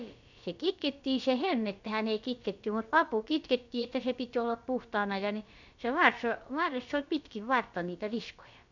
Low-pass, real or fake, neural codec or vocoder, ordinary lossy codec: 7.2 kHz; fake; codec, 16 kHz, about 1 kbps, DyCAST, with the encoder's durations; none